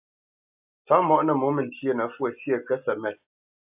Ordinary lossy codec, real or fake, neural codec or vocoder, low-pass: AAC, 32 kbps; real; none; 3.6 kHz